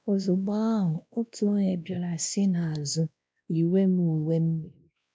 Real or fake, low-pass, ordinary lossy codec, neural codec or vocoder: fake; none; none; codec, 16 kHz, 1 kbps, X-Codec, WavLM features, trained on Multilingual LibriSpeech